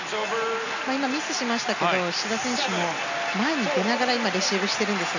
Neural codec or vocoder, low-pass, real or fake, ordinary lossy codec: none; 7.2 kHz; real; none